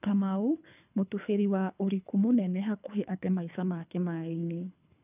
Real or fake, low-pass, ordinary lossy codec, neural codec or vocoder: fake; 3.6 kHz; none; codec, 24 kHz, 3 kbps, HILCodec